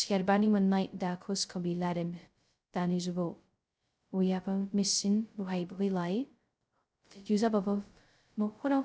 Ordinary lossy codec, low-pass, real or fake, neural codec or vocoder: none; none; fake; codec, 16 kHz, 0.2 kbps, FocalCodec